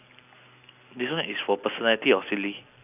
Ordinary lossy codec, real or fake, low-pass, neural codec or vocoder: none; real; 3.6 kHz; none